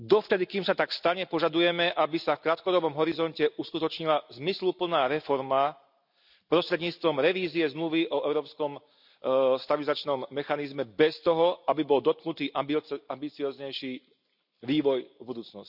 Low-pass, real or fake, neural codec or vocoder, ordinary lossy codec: 5.4 kHz; real; none; none